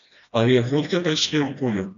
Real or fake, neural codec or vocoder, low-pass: fake; codec, 16 kHz, 1 kbps, FreqCodec, smaller model; 7.2 kHz